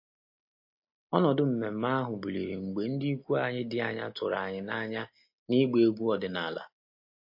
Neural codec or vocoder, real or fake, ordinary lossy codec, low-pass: none; real; MP3, 32 kbps; 5.4 kHz